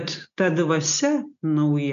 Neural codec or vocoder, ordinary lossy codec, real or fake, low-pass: none; MP3, 96 kbps; real; 7.2 kHz